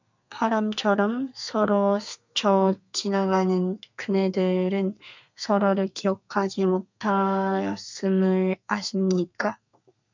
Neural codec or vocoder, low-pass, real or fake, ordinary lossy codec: codec, 32 kHz, 1.9 kbps, SNAC; 7.2 kHz; fake; MP3, 64 kbps